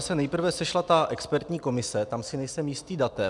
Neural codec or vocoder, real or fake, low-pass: none; real; 10.8 kHz